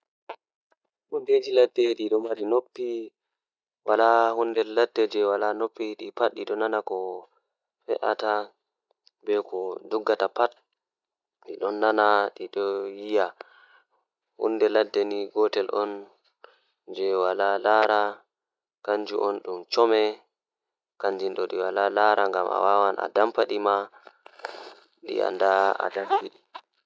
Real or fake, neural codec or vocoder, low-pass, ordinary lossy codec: real; none; none; none